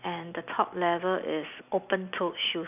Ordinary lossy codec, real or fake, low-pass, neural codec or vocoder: none; real; 3.6 kHz; none